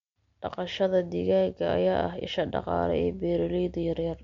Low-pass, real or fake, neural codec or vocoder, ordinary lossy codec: 7.2 kHz; real; none; MP3, 64 kbps